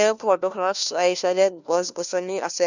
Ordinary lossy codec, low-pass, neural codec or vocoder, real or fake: none; 7.2 kHz; codec, 16 kHz, 1 kbps, FunCodec, trained on LibriTTS, 50 frames a second; fake